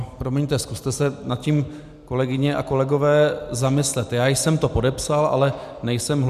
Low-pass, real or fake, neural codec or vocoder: 14.4 kHz; real; none